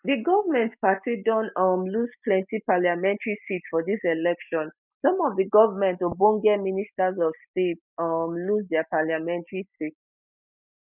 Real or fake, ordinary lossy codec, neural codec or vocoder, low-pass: real; none; none; 3.6 kHz